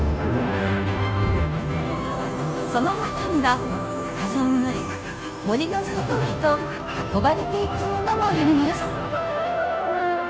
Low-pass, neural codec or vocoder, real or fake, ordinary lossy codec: none; codec, 16 kHz, 0.5 kbps, FunCodec, trained on Chinese and English, 25 frames a second; fake; none